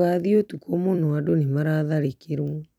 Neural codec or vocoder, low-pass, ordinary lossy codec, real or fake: vocoder, 44.1 kHz, 128 mel bands every 256 samples, BigVGAN v2; 19.8 kHz; none; fake